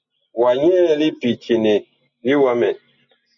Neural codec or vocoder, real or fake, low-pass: none; real; 7.2 kHz